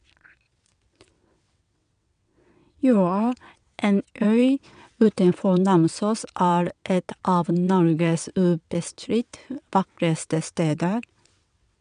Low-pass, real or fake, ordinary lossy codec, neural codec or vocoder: 9.9 kHz; fake; MP3, 96 kbps; vocoder, 22.05 kHz, 80 mel bands, WaveNeXt